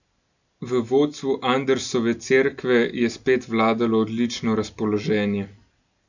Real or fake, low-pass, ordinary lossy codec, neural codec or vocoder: real; 7.2 kHz; none; none